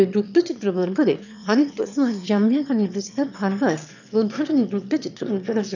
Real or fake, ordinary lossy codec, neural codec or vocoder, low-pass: fake; none; autoencoder, 22.05 kHz, a latent of 192 numbers a frame, VITS, trained on one speaker; 7.2 kHz